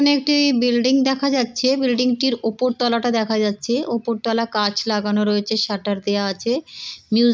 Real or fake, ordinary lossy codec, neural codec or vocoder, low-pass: real; none; none; none